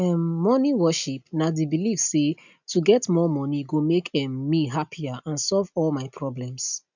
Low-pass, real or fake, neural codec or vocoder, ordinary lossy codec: 7.2 kHz; real; none; none